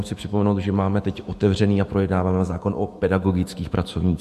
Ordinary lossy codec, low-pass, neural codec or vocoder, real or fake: MP3, 64 kbps; 14.4 kHz; autoencoder, 48 kHz, 128 numbers a frame, DAC-VAE, trained on Japanese speech; fake